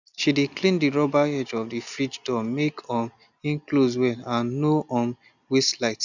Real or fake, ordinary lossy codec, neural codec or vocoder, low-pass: real; none; none; 7.2 kHz